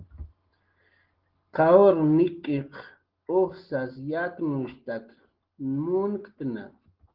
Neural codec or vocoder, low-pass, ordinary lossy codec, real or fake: none; 5.4 kHz; Opus, 16 kbps; real